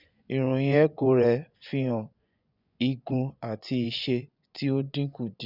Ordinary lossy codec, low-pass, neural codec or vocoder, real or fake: none; 5.4 kHz; vocoder, 24 kHz, 100 mel bands, Vocos; fake